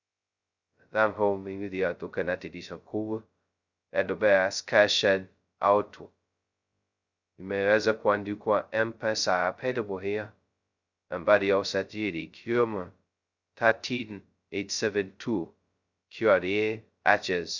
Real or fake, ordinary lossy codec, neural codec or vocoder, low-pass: fake; Opus, 64 kbps; codec, 16 kHz, 0.2 kbps, FocalCodec; 7.2 kHz